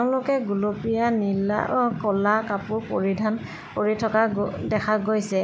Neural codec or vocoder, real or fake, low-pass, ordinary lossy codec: none; real; none; none